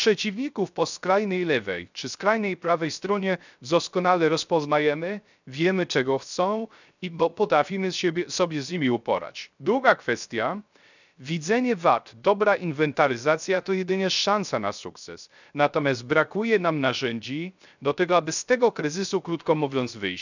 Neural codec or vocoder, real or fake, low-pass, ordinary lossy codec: codec, 16 kHz, 0.3 kbps, FocalCodec; fake; 7.2 kHz; none